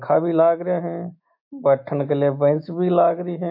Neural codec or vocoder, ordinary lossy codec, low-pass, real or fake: autoencoder, 48 kHz, 128 numbers a frame, DAC-VAE, trained on Japanese speech; MP3, 32 kbps; 5.4 kHz; fake